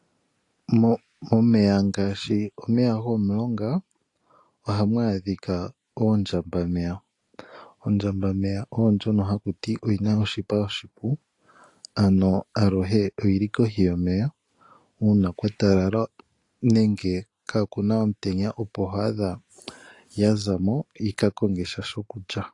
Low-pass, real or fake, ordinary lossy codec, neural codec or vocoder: 10.8 kHz; real; AAC, 48 kbps; none